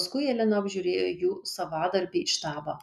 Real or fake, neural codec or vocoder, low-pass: real; none; 14.4 kHz